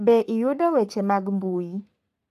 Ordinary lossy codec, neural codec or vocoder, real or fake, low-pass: AAC, 96 kbps; codec, 44.1 kHz, 3.4 kbps, Pupu-Codec; fake; 14.4 kHz